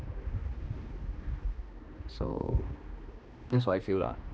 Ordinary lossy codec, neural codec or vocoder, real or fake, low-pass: none; codec, 16 kHz, 2 kbps, X-Codec, HuBERT features, trained on balanced general audio; fake; none